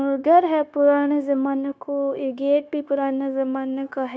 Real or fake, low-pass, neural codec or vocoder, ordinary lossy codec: fake; none; codec, 16 kHz, 0.9 kbps, LongCat-Audio-Codec; none